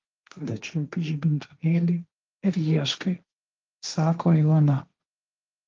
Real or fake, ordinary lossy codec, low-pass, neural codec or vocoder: fake; Opus, 32 kbps; 7.2 kHz; codec, 16 kHz, 1.1 kbps, Voila-Tokenizer